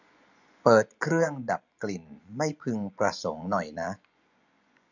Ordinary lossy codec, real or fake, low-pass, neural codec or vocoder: none; fake; 7.2 kHz; vocoder, 24 kHz, 100 mel bands, Vocos